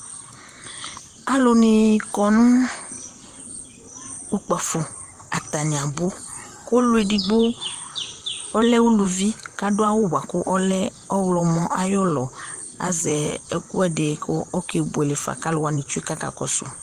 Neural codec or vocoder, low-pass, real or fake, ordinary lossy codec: vocoder, 44.1 kHz, 128 mel bands, Pupu-Vocoder; 14.4 kHz; fake; Opus, 24 kbps